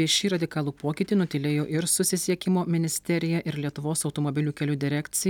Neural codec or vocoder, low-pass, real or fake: none; 19.8 kHz; real